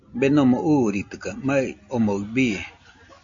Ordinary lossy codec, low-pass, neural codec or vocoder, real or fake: MP3, 48 kbps; 7.2 kHz; none; real